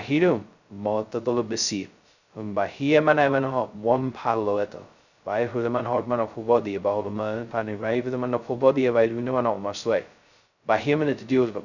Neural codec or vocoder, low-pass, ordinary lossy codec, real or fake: codec, 16 kHz, 0.2 kbps, FocalCodec; 7.2 kHz; none; fake